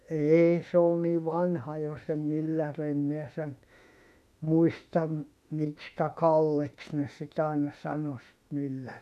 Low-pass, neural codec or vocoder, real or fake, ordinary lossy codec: 14.4 kHz; autoencoder, 48 kHz, 32 numbers a frame, DAC-VAE, trained on Japanese speech; fake; none